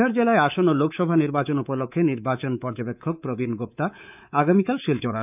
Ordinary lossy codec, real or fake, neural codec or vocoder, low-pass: none; fake; codec, 24 kHz, 3.1 kbps, DualCodec; 3.6 kHz